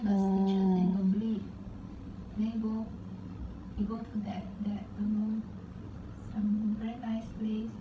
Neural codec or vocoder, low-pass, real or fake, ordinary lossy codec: codec, 16 kHz, 16 kbps, FreqCodec, larger model; none; fake; none